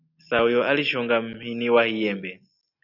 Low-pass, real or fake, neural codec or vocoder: 5.4 kHz; real; none